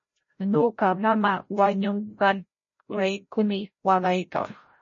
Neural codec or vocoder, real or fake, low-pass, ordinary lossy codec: codec, 16 kHz, 0.5 kbps, FreqCodec, larger model; fake; 7.2 kHz; MP3, 32 kbps